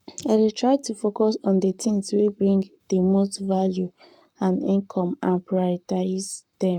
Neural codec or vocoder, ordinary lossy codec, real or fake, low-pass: codec, 44.1 kHz, 7.8 kbps, Pupu-Codec; none; fake; 19.8 kHz